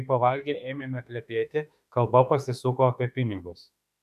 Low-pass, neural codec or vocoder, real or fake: 14.4 kHz; autoencoder, 48 kHz, 32 numbers a frame, DAC-VAE, trained on Japanese speech; fake